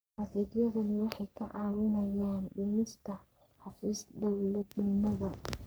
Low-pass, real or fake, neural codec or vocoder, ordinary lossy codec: none; fake; codec, 44.1 kHz, 3.4 kbps, Pupu-Codec; none